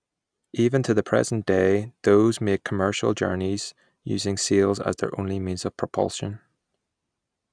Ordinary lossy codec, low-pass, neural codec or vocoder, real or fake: none; 9.9 kHz; none; real